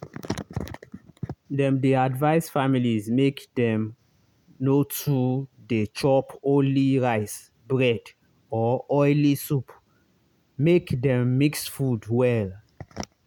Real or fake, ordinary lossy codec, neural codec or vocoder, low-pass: real; none; none; 19.8 kHz